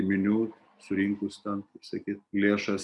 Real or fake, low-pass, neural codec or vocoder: real; 10.8 kHz; none